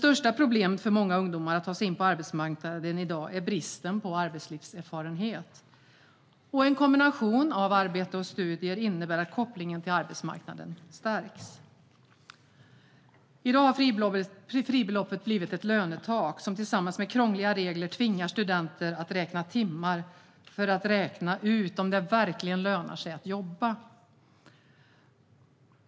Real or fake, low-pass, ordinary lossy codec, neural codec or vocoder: real; none; none; none